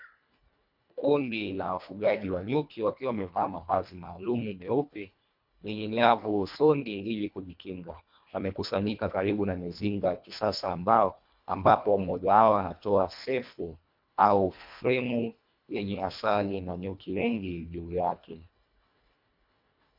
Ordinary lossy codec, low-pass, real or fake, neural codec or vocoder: MP3, 48 kbps; 5.4 kHz; fake; codec, 24 kHz, 1.5 kbps, HILCodec